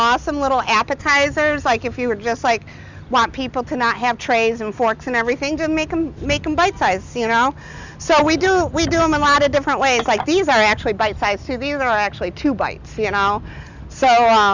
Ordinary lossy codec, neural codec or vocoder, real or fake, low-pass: Opus, 64 kbps; none; real; 7.2 kHz